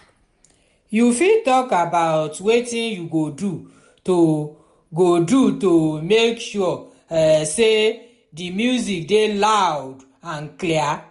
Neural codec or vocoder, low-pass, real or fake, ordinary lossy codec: none; 10.8 kHz; real; MP3, 64 kbps